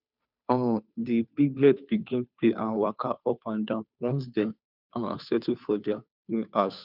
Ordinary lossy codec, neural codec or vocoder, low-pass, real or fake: none; codec, 16 kHz, 2 kbps, FunCodec, trained on Chinese and English, 25 frames a second; 5.4 kHz; fake